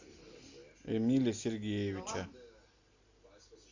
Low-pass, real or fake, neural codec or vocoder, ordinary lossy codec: 7.2 kHz; real; none; AAC, 48 kbps